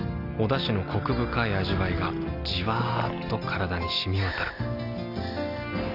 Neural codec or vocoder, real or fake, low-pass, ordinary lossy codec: none; real; 5.4 kHz; none